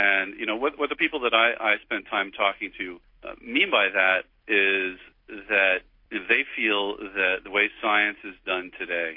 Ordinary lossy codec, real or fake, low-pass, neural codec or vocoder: MP3, 32 kbps; real; 5.4 kHz; none